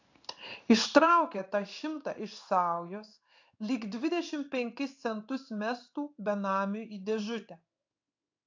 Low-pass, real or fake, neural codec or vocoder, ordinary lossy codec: 7.2 kHz; fake; codec, 16 kHz in and 24 kHz out, 1 kbps, XY-Tokenizer; AAC, 48 kbps